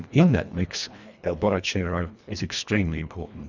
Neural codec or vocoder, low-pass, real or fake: codec, 24 kHz, 1.5 kbps, HILCodec; 7.2 kHz; fake